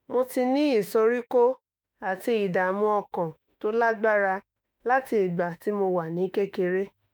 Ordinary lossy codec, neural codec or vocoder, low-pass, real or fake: none; autoencoder, 48 kHz, 32 numbers a frame, DAC-VAE, trained on Japanese speech; none; fake